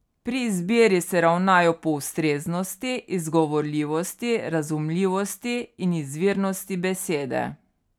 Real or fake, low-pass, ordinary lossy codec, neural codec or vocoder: real; 19.8 kHz; none; none